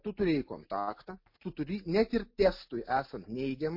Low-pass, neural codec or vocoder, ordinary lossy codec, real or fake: 5.4 kHz; none; MP3, 32 kbps; real